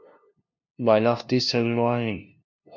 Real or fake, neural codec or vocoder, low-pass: fake; codec, 16 kHz, 0.5 kbps, FunCodec, trained on LibriTTS, 25 frames a second; 7.2 kHz